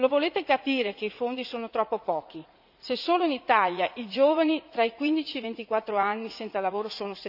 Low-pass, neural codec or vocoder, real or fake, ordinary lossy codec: 5.4 kHz; vocoder, 44.1 kHz, 80 mel bands, Vocos; fake; none